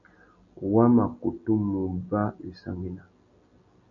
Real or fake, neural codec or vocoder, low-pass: real; none; 7.2 kHz